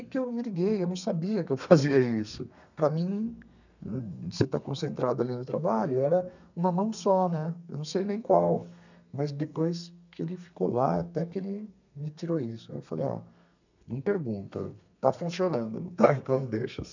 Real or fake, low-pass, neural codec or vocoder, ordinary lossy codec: fake; 7.2 kHz; codec, 32 kHz, 1.9 kbps, SNAC; none